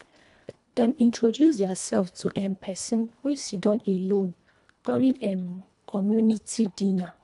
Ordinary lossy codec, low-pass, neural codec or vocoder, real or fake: none; 10.8 kHz; codec, 24 kHz, 1.5 kbps, HILCodec; fake